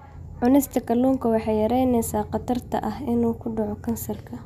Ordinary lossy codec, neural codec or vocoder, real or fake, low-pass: none; none; real; 14.4 kHz